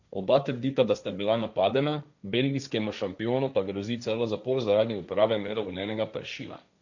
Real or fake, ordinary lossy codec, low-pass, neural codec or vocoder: fake; none; 7.2 kHz; codec, 16 kHz, 1.1 kbps, Voila-Tokenizer